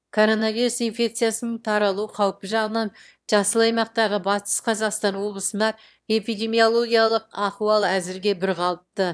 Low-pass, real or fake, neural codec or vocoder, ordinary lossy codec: none; fake; autoencoder, 22.05 kHz, a latent of 192 numbers a frame, VITS, trained on one speaker; none